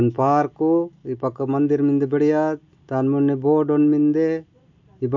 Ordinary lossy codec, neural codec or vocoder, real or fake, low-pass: MP3, 48 kbps; none; real; 7.2 kHz